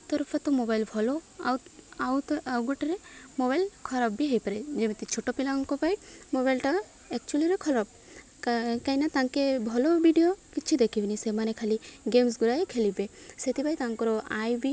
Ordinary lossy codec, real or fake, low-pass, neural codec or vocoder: none; real; none; none